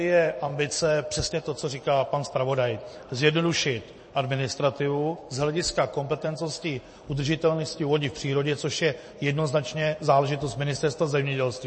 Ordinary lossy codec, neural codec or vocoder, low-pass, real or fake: MP3, 32 kbps; vocoder, 44.1 kHz, 128 mel bands every 256 samples, BigVGAN v2; 9.9 kHz; fake